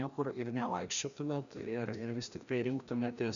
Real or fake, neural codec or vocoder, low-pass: fake; codec, 16 kHz, 1 kbps, FreqCodec, larger model; 7.2 kHz